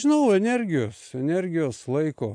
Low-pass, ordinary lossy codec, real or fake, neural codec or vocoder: 9.9 kHz; AAC, 64 kbps; real; none